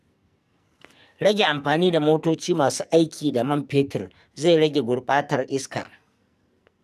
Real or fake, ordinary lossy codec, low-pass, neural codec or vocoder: fake; none; 14.4 kHz; codec, 44.1 kHz, 2.6 kbps, SNAC